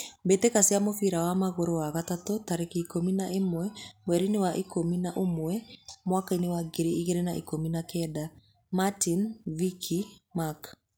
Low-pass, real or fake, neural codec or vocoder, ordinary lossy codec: none; real; none; none